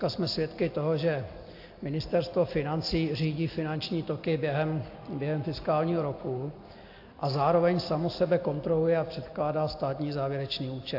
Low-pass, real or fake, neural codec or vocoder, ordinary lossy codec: 5.4 kHz; real; none; AAC, 32 kbps